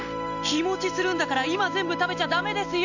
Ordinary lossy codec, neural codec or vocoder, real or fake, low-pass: none; none; real; 7.2 kHz